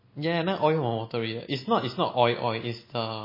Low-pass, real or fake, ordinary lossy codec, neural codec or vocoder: 5.4 kHz; real; MP3, 24 kbps; none